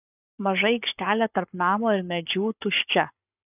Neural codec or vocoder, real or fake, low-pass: codec, 44.1 kHz, 7.8 kbps, Pupu-Codec; fake; 3.6 kHz